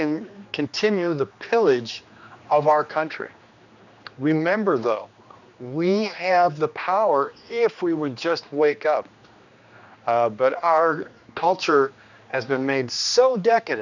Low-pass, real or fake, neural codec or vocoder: 7.2 kHz; fake; codec, 16 kHz, 2 kbps, X-Codec, HuBERT features, trained on general audio